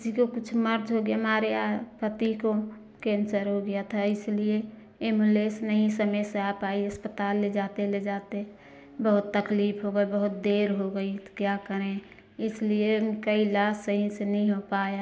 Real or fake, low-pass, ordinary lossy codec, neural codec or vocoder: real; none; none; none